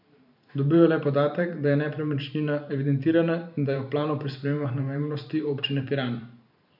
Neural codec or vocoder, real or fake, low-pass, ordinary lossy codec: vocoder, 44.1 kHz, 128 mel bands every 512 samples, BigVGAN v2; fake; 5.4 kHz; none